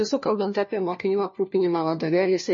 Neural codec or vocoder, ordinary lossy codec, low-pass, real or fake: codec, 16 kHz, 1 kbps, FunCodec, trained on LibriTTS, 50 frames a second; MP3, 32 kbps; 7.2 kHz; fake